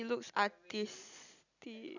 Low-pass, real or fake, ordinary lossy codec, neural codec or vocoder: 7.2 kHz; real; none; none